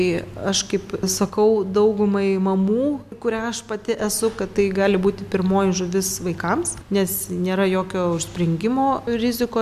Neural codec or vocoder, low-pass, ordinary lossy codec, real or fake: none; 14.4 kHz; MP3, 96 kbps; real